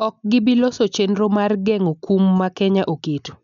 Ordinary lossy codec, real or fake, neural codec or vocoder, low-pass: none; real; none; 7.2 kHz